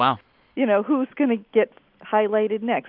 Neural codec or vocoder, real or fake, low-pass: none; real; 5.4 kHz